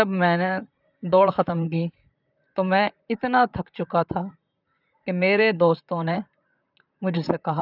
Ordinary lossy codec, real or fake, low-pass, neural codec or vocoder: none; fake; 5.4 kHz; vocoder, 44.1 kHz, 128 mel bands, Pupu-Vocoder